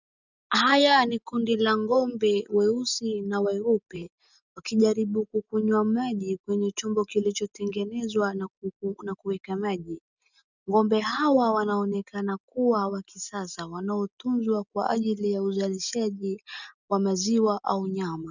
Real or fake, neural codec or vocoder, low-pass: real; none; 7.2 kHz